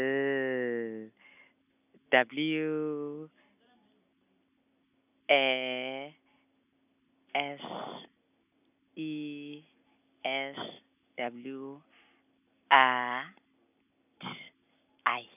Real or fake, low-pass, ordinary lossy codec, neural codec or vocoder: real; 3.6 kHz; none; none